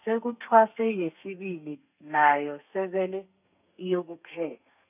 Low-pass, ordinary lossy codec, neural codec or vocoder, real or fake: 3.6 kHz; none; codec, 32 kHz, 1.9 kbps, SNAC; fake